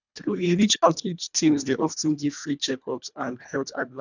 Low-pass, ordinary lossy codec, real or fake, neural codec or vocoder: 7.2 kHz; none; fake; codec, 24 kHz, 1.5 kbps, HILCodec